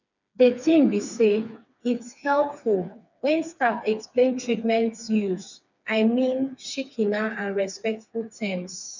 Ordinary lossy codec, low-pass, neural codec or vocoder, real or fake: none; 7.2 kHz; codec, 16 kHz, 4 kbps, FreqCodec, smaller model; fake